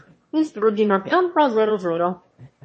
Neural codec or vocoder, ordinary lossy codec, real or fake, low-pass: autoencoder, 22.05 kHz, a latent of 192 numbers a frame, VITS, trained on one speaker; MP3, 32 kbps; fake; 9.9 kHz